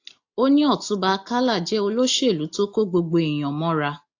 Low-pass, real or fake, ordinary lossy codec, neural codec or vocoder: 7.2 kHz; real; AAC, 48 kbps; none